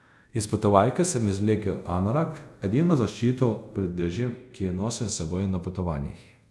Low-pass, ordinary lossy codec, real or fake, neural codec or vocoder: none; none; fake; codec, 24 kHz, 0.5 kbps, DualCodec